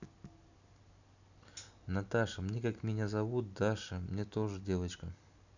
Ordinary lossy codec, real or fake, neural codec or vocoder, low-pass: none; real; none; 7.2 kHz